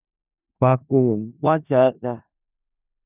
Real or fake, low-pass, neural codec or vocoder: fake; 3.6 kHz; codec, 16 kHz in and 24 kHz out, 0.4 kbps, LongCat-Audio-Codec, four codebook decoder